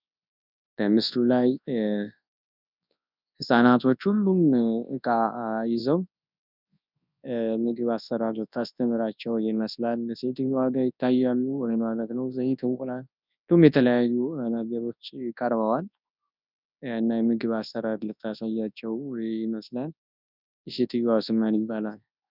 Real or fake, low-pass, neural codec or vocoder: fake; 5.4 kHz; codec, 24 kHz, 0.9 kbps, WavTokenizer, large speech release